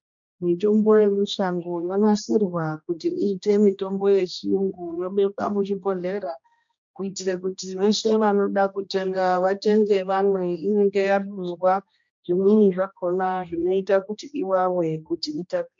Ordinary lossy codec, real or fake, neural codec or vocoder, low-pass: MP3, 48 kbps; fake; codec, 16 kHz, 1 kbps, X-Codec, HuBERT features, trained on general audio; 7.2 kHz